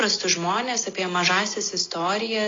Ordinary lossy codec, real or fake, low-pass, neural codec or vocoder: AAC, 32 kbps; real; 7.2 kHz; none